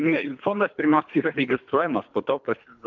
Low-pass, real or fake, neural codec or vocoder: 7.2 kHz; fake; codec, 24 kHz, 3 kbps, HILCodec